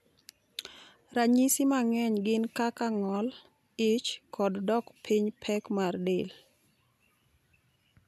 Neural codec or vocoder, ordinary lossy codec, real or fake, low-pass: none; none; real; 14.4 kHz